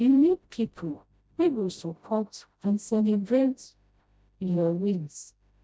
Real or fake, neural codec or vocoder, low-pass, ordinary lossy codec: fake; codec, 16 kHz, 0.5 kbps, FreqCodec, smaller model; none; none